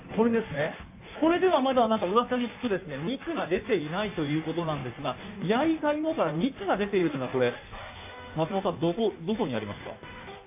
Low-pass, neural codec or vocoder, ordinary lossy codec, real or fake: 3.6 kHz; codec, 16 kHz in and 24 kHz out, 1.1 kbps, FireRedTTS-2 codec; AAC, 32 kbps; fake